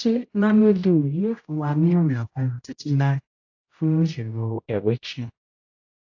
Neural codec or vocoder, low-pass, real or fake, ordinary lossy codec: codec, 16 kHz, 0.5 kbps, X-Codec, HuBERT features, trained on general audio; 7.2 kHz; fake; AAC, 48 kbps